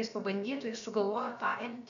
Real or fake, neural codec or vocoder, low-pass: fake; codec, 16 kHz, about 1 kbps, DyCAST, with the encoder's durations; 7.2 kHz